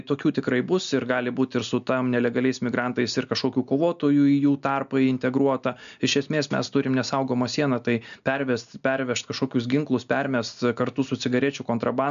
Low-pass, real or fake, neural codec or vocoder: 7.2 kHz; real; none